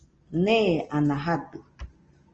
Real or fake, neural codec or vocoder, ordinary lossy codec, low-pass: real; none; Opus, 24 kbps; 7.2 kHz